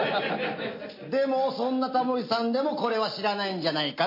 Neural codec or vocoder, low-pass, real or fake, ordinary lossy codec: none; 5.4 kHz; real; MP3, 32 kbps